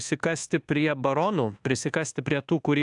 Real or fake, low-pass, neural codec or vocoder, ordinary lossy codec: fake; 10.8 kHz; codec, 24 kHz, 1.2 kbps, DualCodec; AAC, 64 kbps